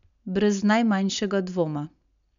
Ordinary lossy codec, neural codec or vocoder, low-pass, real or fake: none; none; 7.2 kHz; real